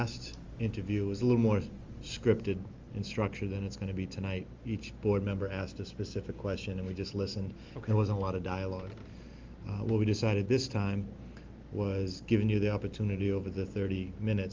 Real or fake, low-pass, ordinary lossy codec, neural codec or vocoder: real; 7.2 kHz; Opus, 32 kbps; none